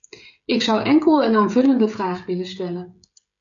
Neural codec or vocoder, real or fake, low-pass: codec, 16 kHz, 16 kbps, FreqCodec, smaller model; fake; 7.2 kHz